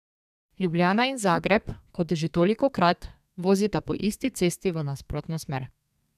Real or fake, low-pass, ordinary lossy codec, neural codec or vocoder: fake; 14.4 kHz; none; codec, 32 kHz, 1.9 kbps, SNAC